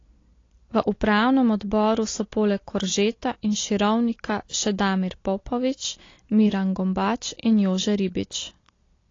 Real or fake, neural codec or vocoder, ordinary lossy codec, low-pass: real; none; AAC, 32 kbps; 7.2 kHz